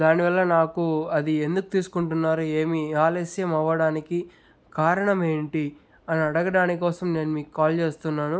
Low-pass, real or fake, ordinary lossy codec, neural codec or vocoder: none; real; none; none